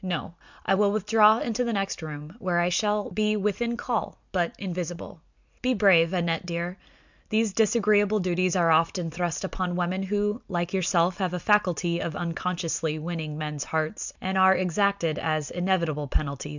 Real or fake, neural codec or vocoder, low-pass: real; none; 7.2 kHz